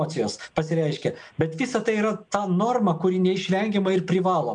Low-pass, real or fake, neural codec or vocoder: 9.9 kHz; real; none